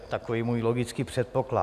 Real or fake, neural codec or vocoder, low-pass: real; none; 14.4 kHz